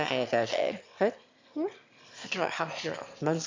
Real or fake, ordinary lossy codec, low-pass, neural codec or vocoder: fake; MP3, 64 kbps; 7.2 kHz; autoencoder, 22.05 kHz, a latent of 192 numbers a frame, VITS, trained on one speaker